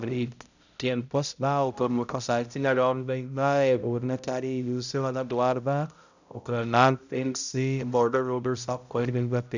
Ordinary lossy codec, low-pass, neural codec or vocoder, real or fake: none; 7.2 kHz; codec, 16 kHz, 0.5 kbps, X-Codec, HuBERT features, trained on balanced general audio; fake